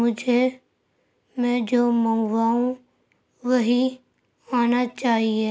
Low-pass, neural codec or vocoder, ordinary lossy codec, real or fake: none; none; none; real